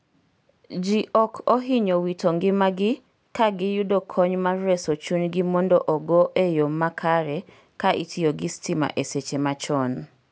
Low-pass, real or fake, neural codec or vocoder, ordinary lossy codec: none; real; none; none